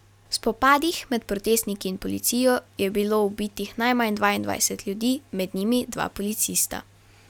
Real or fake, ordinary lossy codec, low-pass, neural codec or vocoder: real; none; 19.8 kHz; none